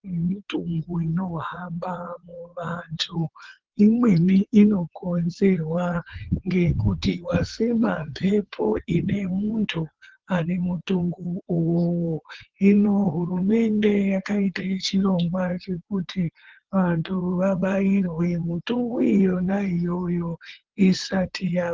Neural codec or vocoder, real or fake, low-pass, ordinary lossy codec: vocoder, 22.05 kHz, 80 mel bands, WaveNeXt; fake; 7.2 kHz; Opus, 16 kbps